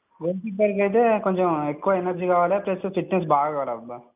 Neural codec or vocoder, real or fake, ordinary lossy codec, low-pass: none; real; none; 3.6 kHz